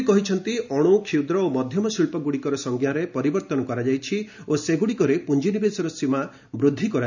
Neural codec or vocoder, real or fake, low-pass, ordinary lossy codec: none; real; 7.2 kHz; none